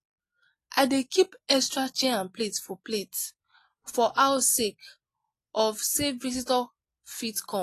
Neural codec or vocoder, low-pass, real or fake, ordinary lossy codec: none; 14.4 kHz; real; AAC, 48 kbps